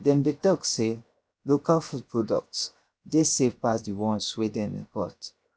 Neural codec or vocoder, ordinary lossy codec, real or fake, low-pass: codec, 16 kHz, 0.7 kbps, FocalCodec; none; fake; none